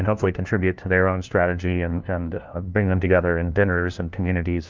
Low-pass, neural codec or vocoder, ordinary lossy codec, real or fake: 7.2 kHz; codec, 16 kHz, 1 kbps, FunCodec, trained on LibriTTS, 50 frames a second; Opus, 32 kbps; fake